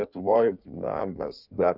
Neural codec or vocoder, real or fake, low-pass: codec, 16 kHz in and 24 kHz out, 1.1 kbps, FireRedTTS-2 codec; fake; 5.4 kHz